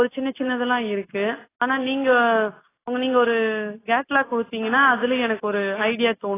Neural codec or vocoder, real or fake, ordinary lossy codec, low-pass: none; real; AAC, 16 kbps; 3.6 kHz